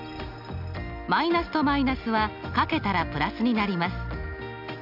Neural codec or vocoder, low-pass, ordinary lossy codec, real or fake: none; 5.4 kHz; none; real